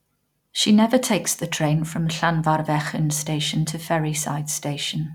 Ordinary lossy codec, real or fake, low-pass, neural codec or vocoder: none; real; 19.8 kHz; none